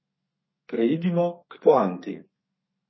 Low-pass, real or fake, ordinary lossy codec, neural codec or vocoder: 7.2 kHz; fake; MP3, 24 kbps; codec, 32 kHz, 1.9 kbps, SNAC